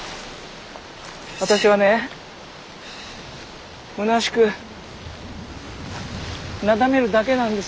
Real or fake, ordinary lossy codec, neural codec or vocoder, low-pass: real; none; none; none